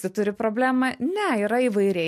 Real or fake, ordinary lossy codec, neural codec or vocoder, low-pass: real; MP3, 64 kbps; none; 14.4 kHz